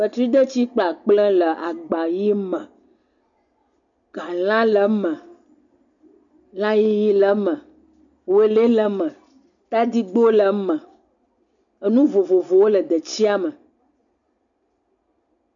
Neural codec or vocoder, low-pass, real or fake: none; 7.2 kHz; real